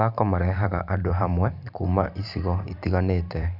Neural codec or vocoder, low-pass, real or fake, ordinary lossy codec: vocoder, 44.1 kHz, 128 mel bands every 512 samples, BigVGAN v2; 5.4 kHz; fake; none